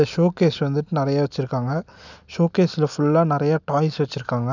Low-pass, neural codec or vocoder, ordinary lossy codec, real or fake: 7.2 kHz; none; none; real